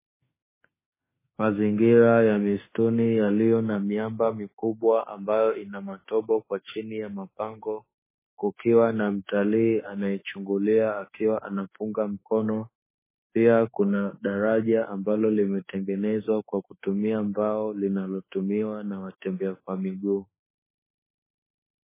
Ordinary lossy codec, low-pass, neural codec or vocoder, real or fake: MP3, 16 kbps; 3.6 kHz; autoencoder, 48 kHz, 32 numbers a frame, DAC-VAE, trained on Japanese speech; fake